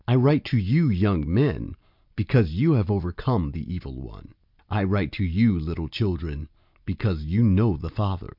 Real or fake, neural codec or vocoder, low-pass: real; none; 5.4 kHz